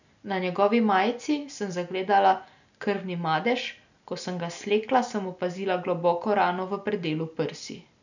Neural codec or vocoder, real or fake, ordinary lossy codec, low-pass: none; real; none; 7.2 kHz